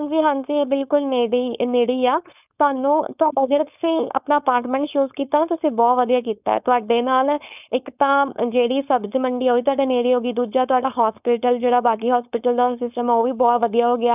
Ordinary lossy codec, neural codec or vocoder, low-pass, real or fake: none; codec, 16 kHz, 4.8 kbps, FACodec; 3.6 kHz; fake